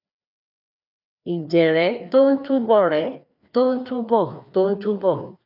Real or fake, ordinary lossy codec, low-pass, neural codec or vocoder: fake; none; 5.4 kHz; codec, 16 kHz, 2 kbps, FreqCodec, larger model